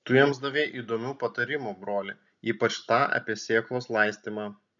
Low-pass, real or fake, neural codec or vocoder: 7.2 kHz; real; none